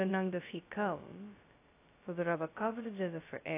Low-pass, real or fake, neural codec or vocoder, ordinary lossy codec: 3.6 kHz; fake; codec, 16 kHz, 0.2 kbps, FocalCodec; AAC, 24 kbps